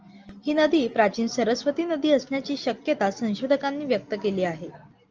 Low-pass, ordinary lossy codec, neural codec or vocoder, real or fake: 7.2 kHz; Opus, 24 kbps; none; real